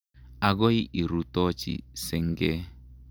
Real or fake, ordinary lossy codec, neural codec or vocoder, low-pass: real; none; none; none